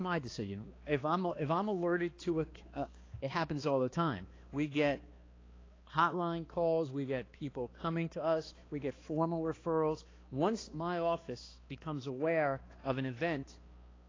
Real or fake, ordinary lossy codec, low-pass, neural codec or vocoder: fake; AAC, 32 kbps; 7.2 kHz; codec, 16 kHz, 2 kbps, X-Codec, HuBERT features, trained on balanced general audio